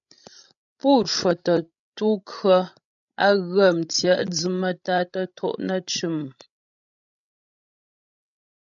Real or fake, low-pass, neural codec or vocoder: fake; 7.2 kHz; codec, 16 kHz, 16 kbps, FreqCodec, larger model